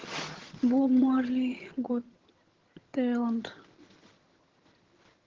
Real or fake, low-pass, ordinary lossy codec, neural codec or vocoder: fake; 7.2 kHz; Opus, 16 kbps; vocoder, 22.05 kHz, 80 mel bands, HiFi-GAN